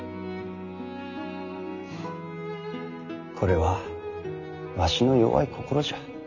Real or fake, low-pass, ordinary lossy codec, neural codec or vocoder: real; 7.2 kHz; none; none